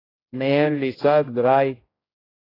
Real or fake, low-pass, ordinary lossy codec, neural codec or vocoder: fake; 5.4 kHz; AAC, 24 kbps; codec, 16 kHz, 0.5 kbps, X-Codec, HuBERT features, trained on general audio